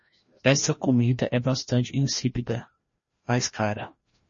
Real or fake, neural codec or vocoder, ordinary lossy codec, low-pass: fake; codec, 16 kHz, 1 kbps, FreqCodec, larger model; MP3, 32 kbps; 7.2 kHz